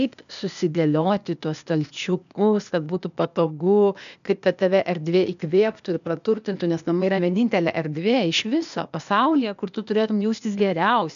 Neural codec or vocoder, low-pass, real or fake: codec, 16 kHz, 0.8 kbps, ZipCodec; 7.2 kHz; fake